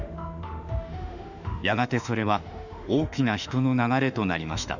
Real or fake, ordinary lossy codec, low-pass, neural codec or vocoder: fake; none; 7.2 kHz; autoencoder, 48 kHz, 32 numbers a frame, DAC-VAE, trained on Japanese speech